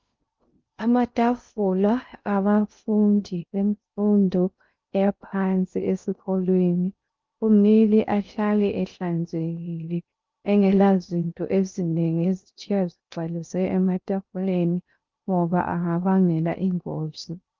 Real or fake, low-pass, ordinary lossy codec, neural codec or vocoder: fake; 7.2 kHz; Opus, 32 kbps; codec, 16 kHz in and 24 kHz out, 0.6 kbps, FocalCodec, streaming, 2048 codes